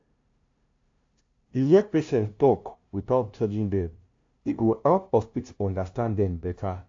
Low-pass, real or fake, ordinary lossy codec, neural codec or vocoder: 7.2 kHz; fake; AAC, 48 kbps; codec, 16 kHz, 0.5 kbps, FunCodec, trained on LibriTTS, 25 frames a second